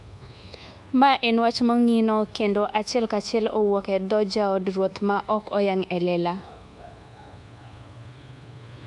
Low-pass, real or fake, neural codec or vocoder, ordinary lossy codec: 10.8 kHz; fake; codec, 24 kHz, 1.2 kbps, DualCodec; MP3, 96 kbps